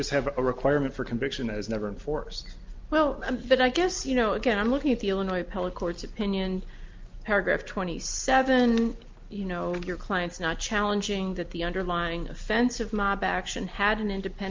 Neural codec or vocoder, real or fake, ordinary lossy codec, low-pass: none; real; Opus, 24 kbps; 7.2 kHz